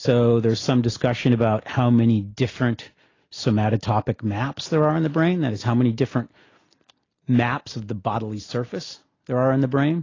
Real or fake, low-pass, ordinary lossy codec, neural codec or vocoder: real; 7.2 kHz; AAC, 32 kbps; none